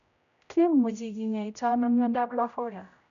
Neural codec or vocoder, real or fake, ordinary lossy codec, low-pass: codec, 16 kHz, 0.5 kbps, X-Codec, HuBERT features, trained on general audio; fake; none; 7.2 kHz